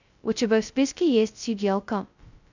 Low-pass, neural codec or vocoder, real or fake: 7.2 kHz; codec, 16 kHz, 0.2 kbps, FocalCodec; fake